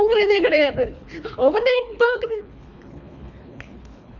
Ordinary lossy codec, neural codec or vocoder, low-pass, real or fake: none; codec, 24 kHz, 3 kbps, HILCodec; 7.2 kHz; fake